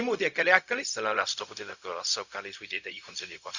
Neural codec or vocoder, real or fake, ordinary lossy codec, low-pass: codec, 16 kHz, 0.4 kbps, LongCat-Audio-Codec; fake; none; 7.2 kHz